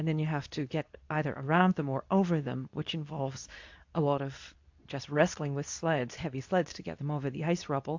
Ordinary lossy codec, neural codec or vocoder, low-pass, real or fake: AAC, 48 kbps; codec, 24 kHz, 0.9 kbps, WavTokenizer, medium speech release version 2; 7.2 kHz; fake